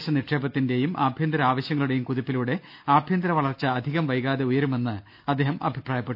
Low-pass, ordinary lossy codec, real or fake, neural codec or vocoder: 5.4 kHz; none; real; none